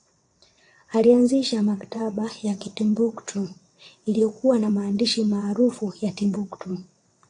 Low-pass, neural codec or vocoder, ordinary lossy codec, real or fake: 9.9 kHz; vocoder, 22.05 kHz, 80 mel bands, WaveNeXt; AAC, 48 kbps; fake